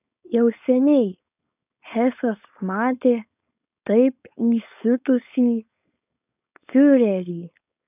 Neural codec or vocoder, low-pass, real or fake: codec, 16 kHz, 4.8 kbps, FACodec; 3.6 kHz; fake